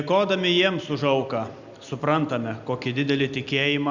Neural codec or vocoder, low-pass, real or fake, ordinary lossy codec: none; 7.2 kHz; real; Opus, 64 kbps